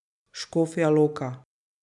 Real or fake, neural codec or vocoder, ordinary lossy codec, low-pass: real; none; none; 10.8 kHz